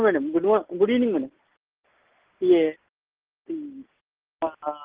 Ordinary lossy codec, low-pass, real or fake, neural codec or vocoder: Opus, 16 kbps; 3.6 kHz; real; none